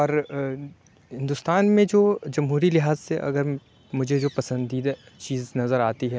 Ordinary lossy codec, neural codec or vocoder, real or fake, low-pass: none; none; real; none